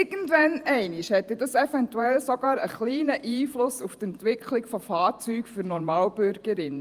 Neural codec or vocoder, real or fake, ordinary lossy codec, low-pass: vocoder, 48 kHz, 128 mel bands, Vocos; fake; Opus, 32 kbps; 14.4 kHz